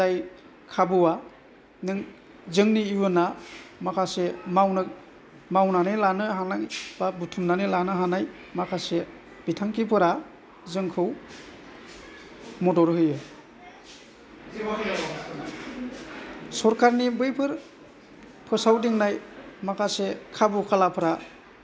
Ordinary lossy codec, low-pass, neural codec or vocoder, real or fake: none; none; none; real